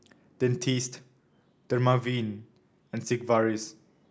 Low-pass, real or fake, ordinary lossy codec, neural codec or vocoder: none; real; none; none